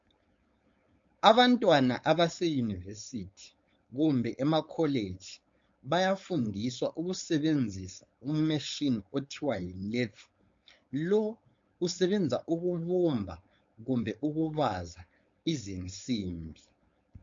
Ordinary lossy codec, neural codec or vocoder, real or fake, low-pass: MP3, 48 kbps; codec, 16 kHz, 4.8 kbps, FACodec; fake; 7.2 kHz